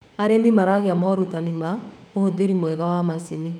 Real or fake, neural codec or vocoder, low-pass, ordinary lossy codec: fake; autoencoder, 48 kHz, 32 numbers a frame, DAC-VAE, trained on Japanese speech; 19.8 kHz; none